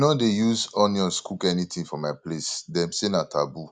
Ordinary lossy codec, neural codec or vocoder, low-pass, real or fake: none; none; 9.9 kHz; real